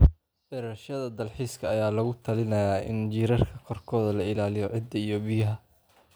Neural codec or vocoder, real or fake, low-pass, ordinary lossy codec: none; real; none; none